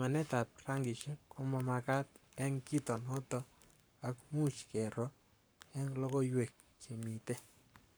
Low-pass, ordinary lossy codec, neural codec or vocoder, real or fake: none; none; codec, 44.1 kHz, 7.8 kbps, Pupu-Codec; fake